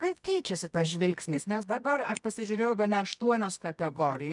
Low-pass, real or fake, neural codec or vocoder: 10.8 kHz; fake; codec, 24 kHz, 0.9 kbps, WavTokenizer, medium music audio release